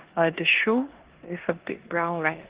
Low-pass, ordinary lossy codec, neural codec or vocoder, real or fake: 3.6 kHz; Opus, 16 kbps; codec, 16 kHz in and 24 kHz out, 0.9 kbps, LongCat-Audio-Codec, four codebook decoder; fake